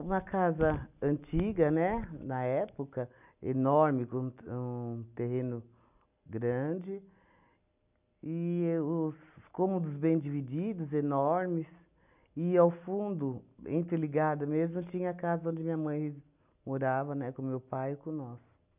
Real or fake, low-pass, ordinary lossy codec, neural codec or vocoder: real; 3.6 kHz; none; none